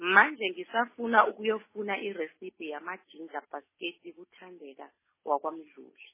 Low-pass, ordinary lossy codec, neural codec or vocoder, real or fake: 3.6 kHz; MP3, 16 kbps; none; real